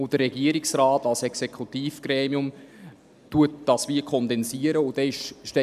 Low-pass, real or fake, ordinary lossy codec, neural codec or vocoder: 14.4 kHz; real; none; none